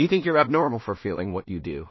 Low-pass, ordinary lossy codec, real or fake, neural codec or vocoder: 7.2 kHz; MP3, 24 kbps; fake; codec, 16 kHz in and 24 kHz out, 0.4 kbps, LongCat-Audio-Codec, two codebook decoder